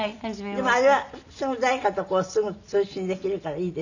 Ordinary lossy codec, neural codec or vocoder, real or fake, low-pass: none; none; real; 7.2 kHz